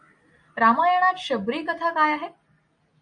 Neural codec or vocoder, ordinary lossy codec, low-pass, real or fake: none; MP3, 48 kbps; 9.9 kHz; real